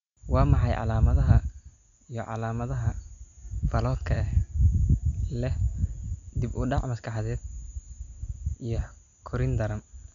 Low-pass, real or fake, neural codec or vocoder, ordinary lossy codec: 7.2 kHz; real; none; none